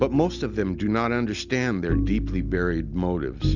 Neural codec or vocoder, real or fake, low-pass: none; real; 7.2 kHz